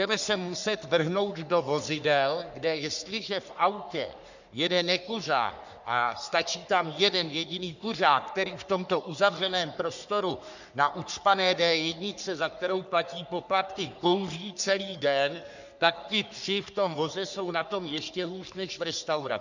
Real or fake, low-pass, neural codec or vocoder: fake; 7.2 kHz; codec, 44.1 kHz, 3.4 kbps, Pupu-Codec